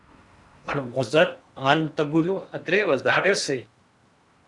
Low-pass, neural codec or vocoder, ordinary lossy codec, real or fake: 10.8 kHz; codec, 16 kHz in and 24 kHz out, 0.8 kbps, FocalCodec, streaming, 65536 codes; Opus, 64 kbps; fake